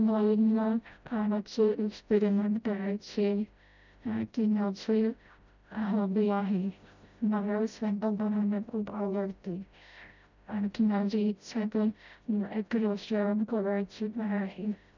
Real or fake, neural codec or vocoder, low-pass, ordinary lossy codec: fake; codec, 16 kHz, 0.5 kbps, FreqCodec, smaller model; 7.2 kHz; none